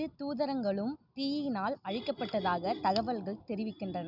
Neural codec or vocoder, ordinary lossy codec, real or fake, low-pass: none; none; real; 5.4 kHz